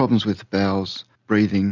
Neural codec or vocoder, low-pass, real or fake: none; 7.2 kHz; real